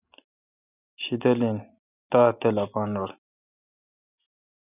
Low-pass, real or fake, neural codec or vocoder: 3.6 kHz; real; none